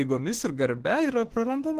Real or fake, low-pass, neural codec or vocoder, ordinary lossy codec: fake; 14.4 kHz; autoencoder, 48 kHz, 32 numbers a frame, DAC-VAE, trained on Japanese speech; Opus, 16 kbps